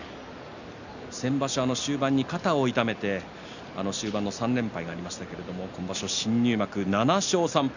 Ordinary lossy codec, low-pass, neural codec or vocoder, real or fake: none; 7.2 kHz; none; real